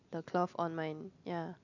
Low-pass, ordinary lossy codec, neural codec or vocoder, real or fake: 7.2 kHz; none; none; real